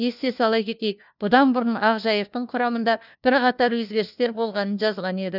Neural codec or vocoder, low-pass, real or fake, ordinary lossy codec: codec, 16 kHz, 1 kbps, FunCodec, trained on LibriTTS, 50 frames a second; 5.4 kHz; fake; none